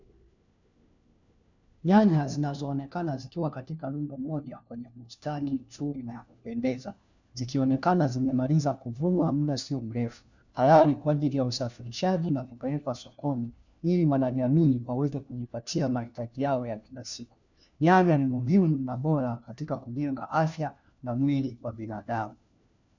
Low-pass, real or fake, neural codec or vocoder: 7.2 kHz; fake; codec, 16 kHz, 1 kbps, FunCodec, trained on LibriTTS, 50 frames a second